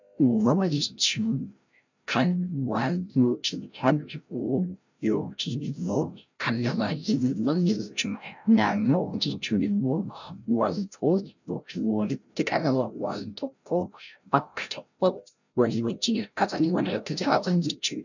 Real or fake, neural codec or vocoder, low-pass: fake; codec, 16 kHz, 0.5 kbps, FreqCodec, larger model; 7.2 kHz